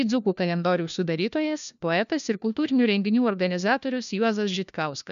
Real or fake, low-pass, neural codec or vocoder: fake; 7.2 kHz; codec, 16 kHz, 1 kbps, FunCodec, trained on LibriTTS, 50 frames a second